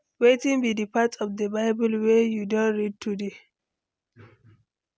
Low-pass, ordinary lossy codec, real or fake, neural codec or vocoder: none; none; real; none